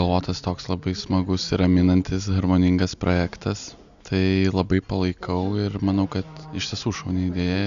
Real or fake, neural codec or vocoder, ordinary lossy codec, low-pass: real; none; AAC, 96 kbps; 7.2 kHz